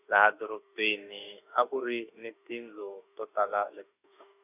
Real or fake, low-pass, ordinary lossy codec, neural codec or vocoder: fake; 3.6 kHz; none; autoencoder, 48 kHz, 32 numbers a frame, DAC-VAE, trained on Japanese speech